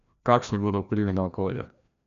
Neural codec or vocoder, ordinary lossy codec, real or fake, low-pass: codec, 16 kHz, 1 kbps, FreqCodec, larger model; none; fake; 7.2 kHz